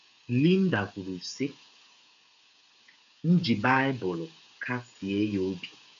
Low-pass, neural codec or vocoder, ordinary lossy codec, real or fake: 7.2 kHz; codec, 16 kHz, 8 kbps, FreqCodec, smaller model; none; fake